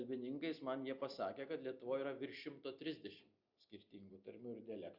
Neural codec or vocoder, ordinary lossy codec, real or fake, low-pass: none; Opus, 64 kbps; real; 5.4 kHz